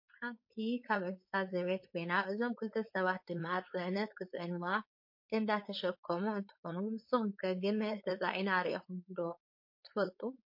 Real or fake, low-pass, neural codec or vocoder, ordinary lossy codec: fake; 5.4 kHz; codec, 16 kHz, 4.8 kbps, FACodec; MP3, 32 kbps